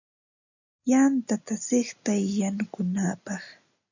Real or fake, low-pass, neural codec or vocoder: real; 7.2 kHz; none